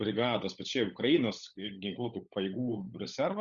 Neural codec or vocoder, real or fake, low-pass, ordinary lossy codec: codec, 16 kHz, 16 kbps, FunCodec, trained on LibriTTS, 50 frames a second; fake; 7.2 kHz; Opus, 64 kbps